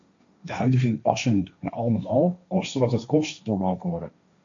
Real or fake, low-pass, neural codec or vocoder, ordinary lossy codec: fake; 7.2 kHz; codec, 16 kHz, 1.1 kbps, Voila-Tokenizer; MP3, 64 kbps